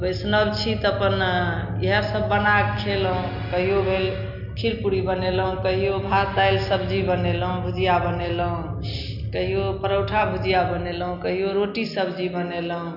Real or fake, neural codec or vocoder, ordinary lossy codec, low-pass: real; none; none; 5.4 kHz